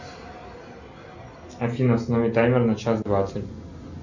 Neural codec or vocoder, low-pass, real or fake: none; 7.2 kHz; real